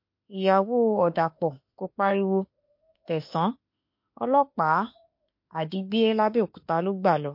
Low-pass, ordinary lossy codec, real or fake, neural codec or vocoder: 5.4 kHz; MP3, 32 kbps; fake; autoencoder, 48 kHz, 32 numbers a frame, DAC-VAE, trained on Japanese speech